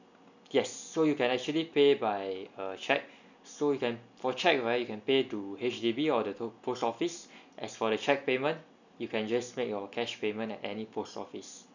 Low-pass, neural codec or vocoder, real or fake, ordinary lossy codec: 7.2 kHz; none; real; none